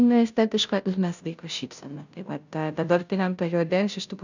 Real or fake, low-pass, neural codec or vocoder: fake; 7.2 kHz; codec, 16 kHz, 0.5 kbps, FunCodec, trained on Chinese and English, 25 frames a second